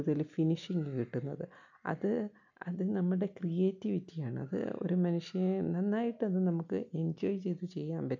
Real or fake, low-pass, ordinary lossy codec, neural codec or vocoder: real; 7.2 kHz; none; none